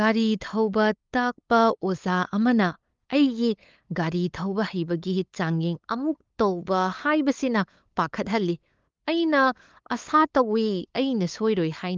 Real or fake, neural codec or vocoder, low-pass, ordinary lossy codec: fake; codec, 16 kHz, 8 kbps, FunCodec, trained on LibriTTS, 25 frames a second; 7.2 kHz; Opus, 32 kbps